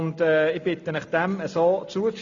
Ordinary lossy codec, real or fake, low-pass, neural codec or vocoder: MP3, 64 kbps; real; 7.2 kHz; none